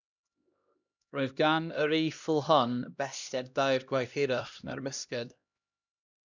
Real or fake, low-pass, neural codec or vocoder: fake; 7.2 kHz; codec, 16 kHz, 1 kbps, X-Codec, HuBERT features, trained on LibriSpeech